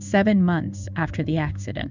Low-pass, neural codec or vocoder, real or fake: 7.2 kHz; codec, 16 kHz in and 24 kHz out, 1 kbps, XY-Tokenizer; fake